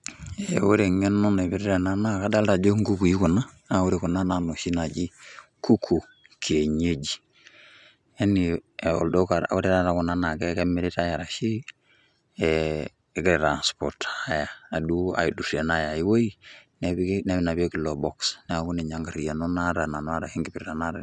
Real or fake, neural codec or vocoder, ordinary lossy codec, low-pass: real; none; none; 10.8 kHz